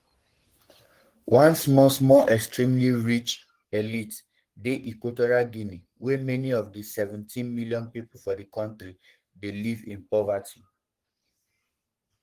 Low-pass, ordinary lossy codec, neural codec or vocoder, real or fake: 14.4 kHz; Opus, 24 kbps; codec, 44.1 kHz, 3.4 kbps, Pupu-Codec; fake